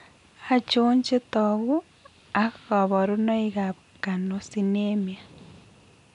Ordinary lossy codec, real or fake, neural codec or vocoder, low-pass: none; real; none; 10.8 kHz